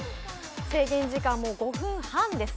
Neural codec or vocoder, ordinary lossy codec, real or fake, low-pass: none; none; real; none